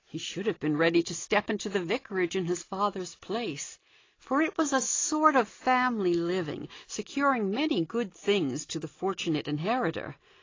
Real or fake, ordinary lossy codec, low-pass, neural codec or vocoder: real; AAC, 32 kbps; 7.2 kHz; none